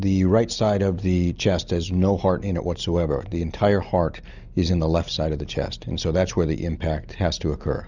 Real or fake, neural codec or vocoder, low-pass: real; none; 7.2 kHz